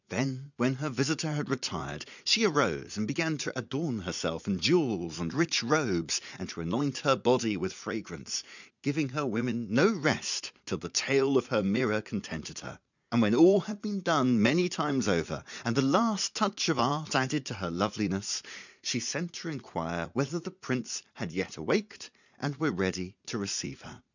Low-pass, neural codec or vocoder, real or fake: 7.2 kHz; vocoder, 44.1 kHz, 80 mel bands, Vocos; fake